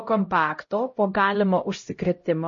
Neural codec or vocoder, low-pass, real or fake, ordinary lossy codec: codec, 16 kHz, 0.5 kbps, X-Codec, HuBERT features, trained on LibriSpeech; 7.2 kHz; fake; MP3, 32 kbps